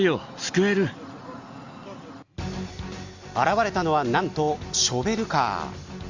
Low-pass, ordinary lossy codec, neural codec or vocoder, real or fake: 7.2 kHz; Opus, 64 kbps; none; real